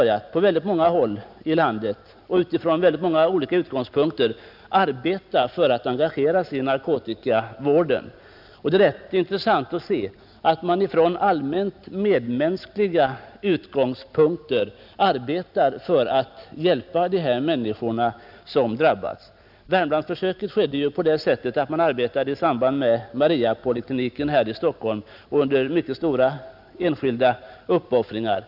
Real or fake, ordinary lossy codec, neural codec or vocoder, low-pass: real; none; none; 5.4 kHz